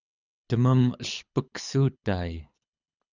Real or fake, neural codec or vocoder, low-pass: fake; codec, 24 kHz, 6 kbps, HILCodec; 7.2 kHz